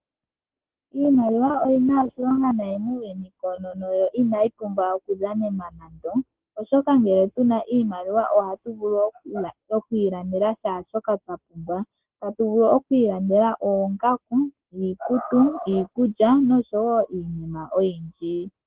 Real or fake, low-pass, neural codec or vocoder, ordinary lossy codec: real; 3.6 kHz; none; Opus, 16 kbps